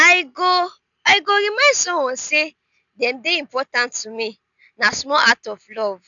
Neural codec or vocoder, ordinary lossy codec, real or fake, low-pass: none; none; real; 7.2 kHz